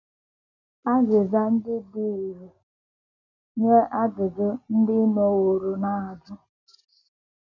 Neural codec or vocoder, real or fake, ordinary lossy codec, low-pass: none; real; none; 7.2 kHz